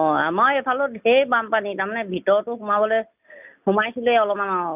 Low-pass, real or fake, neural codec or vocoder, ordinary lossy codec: 3.6 kHz; real; none; none